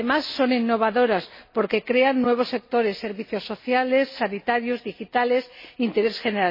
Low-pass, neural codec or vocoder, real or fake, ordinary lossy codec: 5.4 kHz; none; real; MP3, 24 kbps